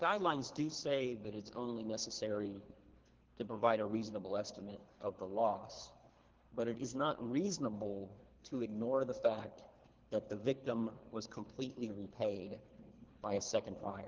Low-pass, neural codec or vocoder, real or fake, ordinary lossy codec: 7.2 kHz; codec, 24 kHz, 3 kbps, HILCodec; fake; Opus, 16 kbps